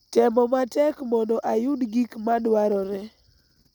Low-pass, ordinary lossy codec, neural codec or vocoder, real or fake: none; none; none; real